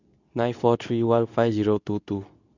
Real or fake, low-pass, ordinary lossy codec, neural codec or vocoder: fake; 7.2 kHz; none; codec, 24 kHz, 0.9 kbps, WavTokenizer, medium speech release version 2